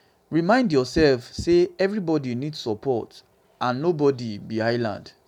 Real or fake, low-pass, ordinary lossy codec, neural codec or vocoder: real; 19.8 kHz; none; none